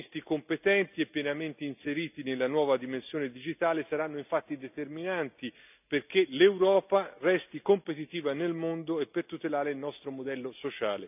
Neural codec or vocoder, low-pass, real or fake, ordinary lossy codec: none; 3.6 kHz; real; none